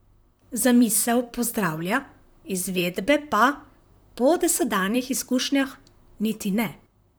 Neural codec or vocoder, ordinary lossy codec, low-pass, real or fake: vocoder, 44.1 kHz, 128 mel bands, Pupu-Vocoder; none; none; fake